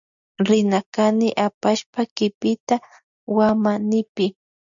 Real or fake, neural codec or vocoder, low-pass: real; none; 7.2 kHz